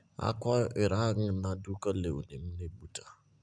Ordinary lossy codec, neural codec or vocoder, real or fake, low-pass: none; none; real; 9.9 kHz